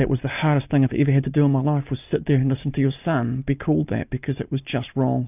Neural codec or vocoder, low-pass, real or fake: none; 3.6 kHz; real